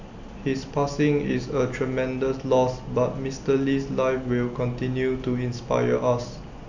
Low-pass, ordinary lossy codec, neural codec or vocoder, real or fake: 7.2 kHz; none; none; real